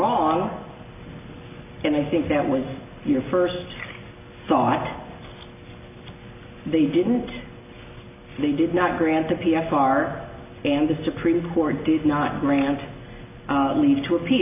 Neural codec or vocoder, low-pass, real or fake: none; 3.6 kHz; real